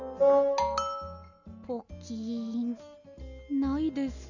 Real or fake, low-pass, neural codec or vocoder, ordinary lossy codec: real; 7.2 kHz; none; none